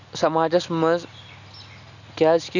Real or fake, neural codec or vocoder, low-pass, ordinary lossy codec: real; none; 7.2 kHz; none